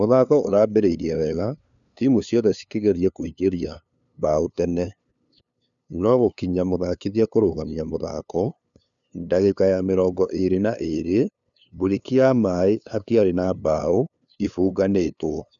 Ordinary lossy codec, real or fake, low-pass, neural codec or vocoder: none; fake; 7.2 kHz; codec, 16 kHz, 2 kbps, FunCodec, trained on LibriTTS, 25 frames a second